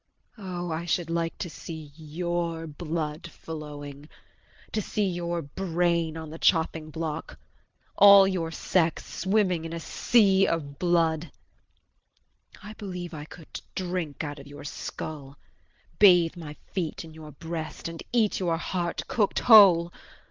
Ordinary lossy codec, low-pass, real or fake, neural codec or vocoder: Opus, 24 kbps; 7.2 kHz; real; none